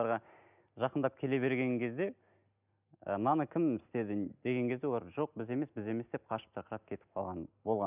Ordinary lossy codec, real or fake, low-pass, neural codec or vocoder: none; real; 3.6 kHz; none